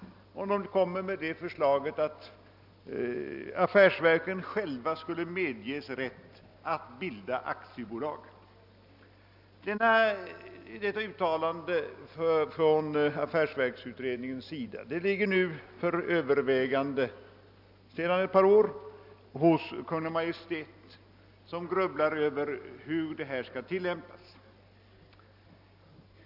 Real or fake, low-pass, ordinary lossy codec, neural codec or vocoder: real; 5.4 kHz; none; none